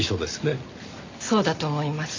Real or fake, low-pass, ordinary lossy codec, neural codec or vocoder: real; 7.2 kHz; MP3, 64 kbps; none